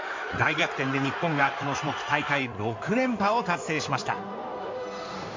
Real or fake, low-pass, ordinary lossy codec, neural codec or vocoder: fake; 7.2 kHz; MP3, 48 kbps; codec, 16 kHz in and 24 kHz out, 2.2 kbps, FireRedTTS-2 codec